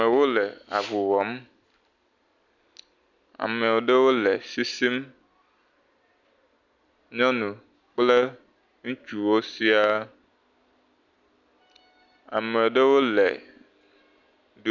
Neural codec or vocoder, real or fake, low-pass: none; real; 7.2 kHz